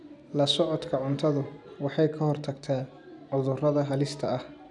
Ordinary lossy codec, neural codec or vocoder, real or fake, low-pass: none; none; real; 10.8 kHz